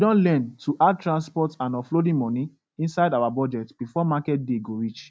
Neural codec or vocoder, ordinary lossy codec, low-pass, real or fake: none; none; none; real